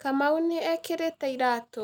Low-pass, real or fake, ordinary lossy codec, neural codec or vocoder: none; real; none; none